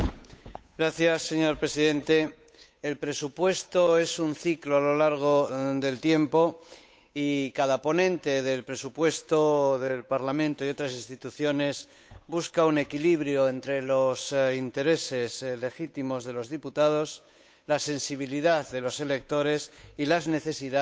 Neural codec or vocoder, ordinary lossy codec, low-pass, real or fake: codec, 16 kHz, 8 kbps, FunCodec, trained on Chinese and English, 25 frames a second; none; none; fake